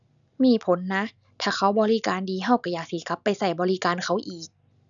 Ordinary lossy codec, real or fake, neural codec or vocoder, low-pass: none; real; none; 7.2 kHz